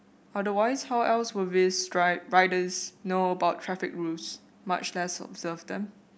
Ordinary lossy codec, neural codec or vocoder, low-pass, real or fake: none; none; none; real